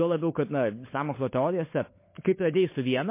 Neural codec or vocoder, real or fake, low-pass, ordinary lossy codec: codec, 16 kHz, 16 kbps, FunCodec, trained on LibriTTS, 50 frames a second; fake; 3.6 kHz; MP3, 24 kbps